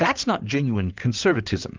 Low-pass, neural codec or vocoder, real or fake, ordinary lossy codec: 7.2 kHz; codec, 16 kHz in and 24 kHz out, 2.2 kbps, FireRedTTS-2 codec; fake; Opus, 24 kbps